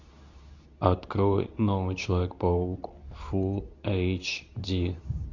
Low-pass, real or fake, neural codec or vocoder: 7.2 kHz; fake; codec, 24 kHz, 0.9 kbps, WavTokenizer, medium speech release version 2